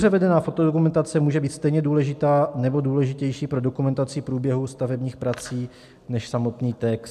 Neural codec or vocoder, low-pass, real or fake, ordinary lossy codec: none; 14.4 kHz; real; MP3, 96 kbps